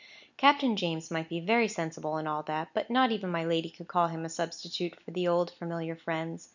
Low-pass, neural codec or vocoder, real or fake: 7.2 kHz; none; real